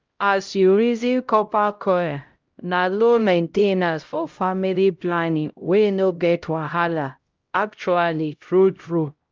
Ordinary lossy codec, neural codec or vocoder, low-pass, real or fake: Opus, 24 kbps; codec, 16 kHz, 0.5 kbps, X-Codec, HuBERT features, trained on LibriSpeech; 7.2 kHz; fake